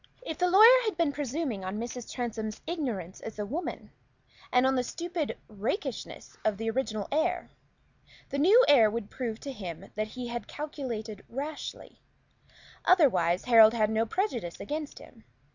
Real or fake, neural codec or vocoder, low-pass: real; none; 7.2 kHz